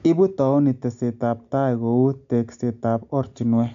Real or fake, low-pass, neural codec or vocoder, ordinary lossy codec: real; 7.2 kHz; none; MP3, 64 kbps